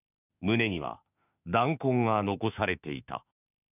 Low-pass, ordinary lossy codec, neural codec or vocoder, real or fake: 3.6 kHz; none; autoencoder, 48 kHz, 32 numbers a frame, DAC-VAE, trained on Japanese speech; fake